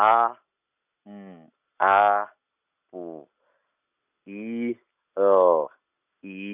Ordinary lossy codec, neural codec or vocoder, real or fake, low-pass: none; none; real; 3.6 kHz